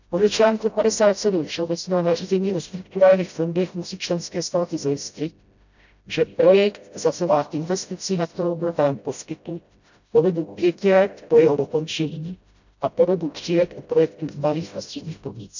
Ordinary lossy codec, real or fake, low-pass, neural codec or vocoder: none; fake; 7.2 kHz; codec, 16 kHz, 0.5 kbps, FreqCodec, smaller model